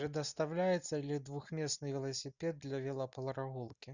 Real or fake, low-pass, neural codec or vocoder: real; 7.2 kHz; none